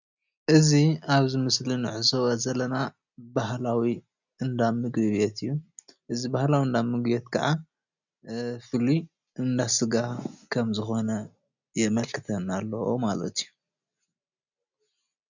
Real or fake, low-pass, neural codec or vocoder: real; 7.2 kHz; none